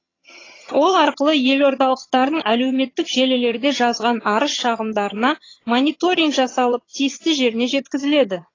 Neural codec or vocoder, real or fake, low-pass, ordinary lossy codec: vocoder, 22.05 kHz, 80 mel bands, HiFi-GAN; fake; 7.2 kHz; AAC, 32 kbps